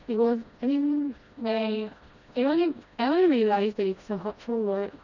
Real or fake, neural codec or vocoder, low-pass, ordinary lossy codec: fake; codec, 16 kHz, 1 kbps, FreqCodec, smaller model; 7.2 kHz; none